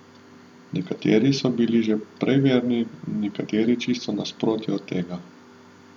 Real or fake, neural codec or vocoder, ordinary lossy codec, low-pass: real; none; none; 19.8 kHz